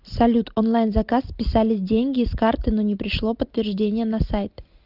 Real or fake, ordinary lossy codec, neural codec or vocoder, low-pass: real; Opus, 24 kbps; none; 5.4 kHz